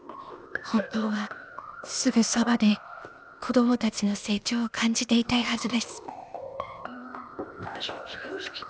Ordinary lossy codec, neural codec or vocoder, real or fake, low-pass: none; codec, 16 kHz, 0.8 kbps, ZipCodec; fake; none